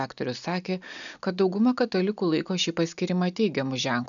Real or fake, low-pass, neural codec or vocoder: real; 7.2 kHz; none